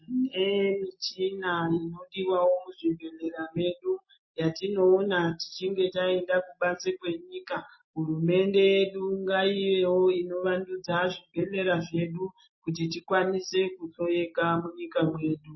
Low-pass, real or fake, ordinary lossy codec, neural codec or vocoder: 7.2 kHz; real; MP3, 24 kbps; none